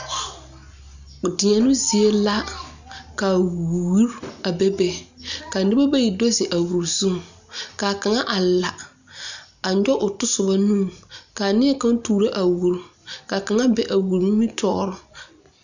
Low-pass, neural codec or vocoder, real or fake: 7.2 kHz; none; real